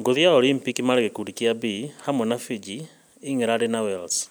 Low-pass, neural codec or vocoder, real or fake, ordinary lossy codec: none; none; real; none